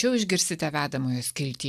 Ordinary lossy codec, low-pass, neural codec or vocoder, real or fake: AAC, 96 kbps; 14.4 kHz; none; real